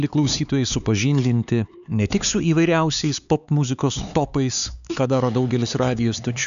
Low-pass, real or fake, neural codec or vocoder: 7.2 kHz; fake; codec, 16 kHz, 4 kbps, X-Codec, HuBERT features, trained on LibriSpeech